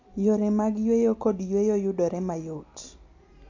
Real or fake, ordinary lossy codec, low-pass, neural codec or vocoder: real; AAC, 48 kbps; 7.2 kHz; none